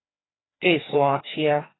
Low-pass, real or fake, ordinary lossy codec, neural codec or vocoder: 7.2 kHz; fake; AAC, 16 kbps; codec, 16 kHz, 2 kbps, FreqCodec, larger model